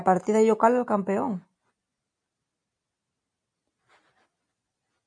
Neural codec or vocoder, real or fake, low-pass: none; real; 9.9 kHz